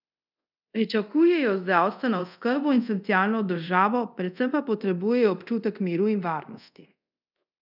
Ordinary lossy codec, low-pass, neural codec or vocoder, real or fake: none; 5.4 kHz; codec, 24 kHz, 0.5 kbps, DualCodec; fake